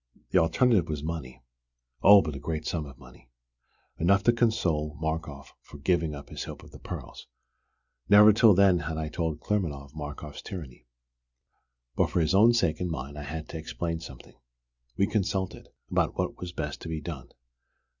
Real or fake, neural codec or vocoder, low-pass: real; none; 7.2 kHz